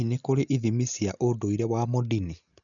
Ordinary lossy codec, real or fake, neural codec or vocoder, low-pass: none; real; none; 7.2 kHz